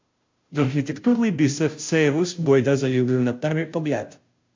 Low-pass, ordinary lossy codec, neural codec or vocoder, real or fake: 7.2 kHz; MP3, 48 kbps; codec, 16 kHz, 0.5 kbps, FunCodec, trained on Chinese and English, 25 frames a second; fake